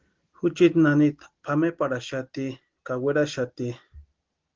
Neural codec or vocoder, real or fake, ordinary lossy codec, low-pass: none; real; Opus, 16 kbps; 7.2 kHz